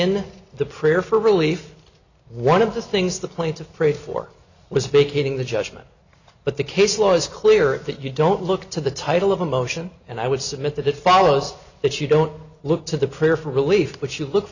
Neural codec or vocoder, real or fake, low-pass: none; real; 7.2 kHz